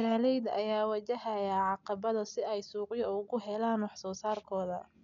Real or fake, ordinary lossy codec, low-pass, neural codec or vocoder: real; none; 7.2 kHz; none